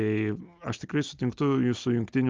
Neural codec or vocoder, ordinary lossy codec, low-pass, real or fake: none; Opus, 24 kbps; 7.2 kHz; real